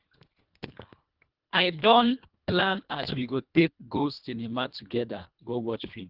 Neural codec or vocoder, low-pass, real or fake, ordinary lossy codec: codec, 24 kHz, 1.5 kbps, HILCodec; 5.4 kHz; fake; Opus, 32 kbps